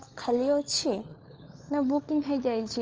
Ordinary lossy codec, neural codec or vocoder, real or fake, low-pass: Opus, 24 kbps; codec, 16 kHz, 4 kbps, FunCodec, trained on LibriTTS, 50 frames a second; fake; 7.2 kHz